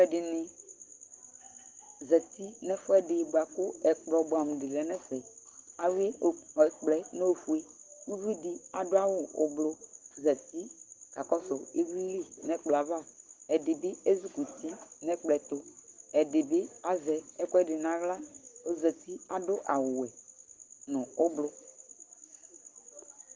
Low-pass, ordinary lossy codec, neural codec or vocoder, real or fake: 7.2 kHz; Opus, 16 kbps; none; real